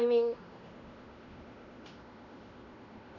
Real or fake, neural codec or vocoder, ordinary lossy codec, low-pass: fake; codec, 16 kHz in and 24 kHz out, 0.9 kbps, LongCat-Audio-Codec, fine tuned four codebook decoder; none; 7.2 kHz